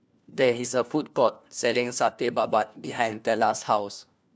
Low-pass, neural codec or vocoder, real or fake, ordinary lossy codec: none; codec, 16 kHz, 1 kbps, FunCodec, trained on LibriTTS, 50 frames a second; fake; none